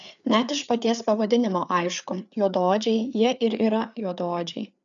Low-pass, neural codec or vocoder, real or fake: 7.2 kHz; codec, 16 kHz, 4 kbps, FreqCodec, larger model; fake